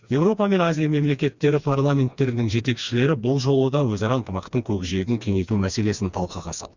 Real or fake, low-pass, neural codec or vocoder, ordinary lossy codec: fake; 7.2 kHz; codec, 16 kHz, 2 kbps, FreqCodec, smaller model; Opus, 64 kbps